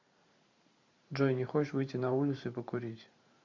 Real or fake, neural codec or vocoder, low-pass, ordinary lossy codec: fake; vocoder, 44.1 kHz, 128 mel bands every 512 samples, BigVGAN v2; 7.2 kHz; MP3, 48 kbps